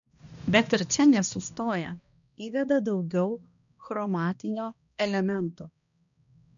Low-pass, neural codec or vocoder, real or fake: 7.2 kHz; codec, 16 kHz, 1 kbps, X-Codec, HuBERT features, trained on balanced general audio; fake